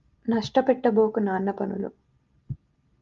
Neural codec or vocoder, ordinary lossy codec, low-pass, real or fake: none; Opus, 32 kbps; 7.2 kHz; real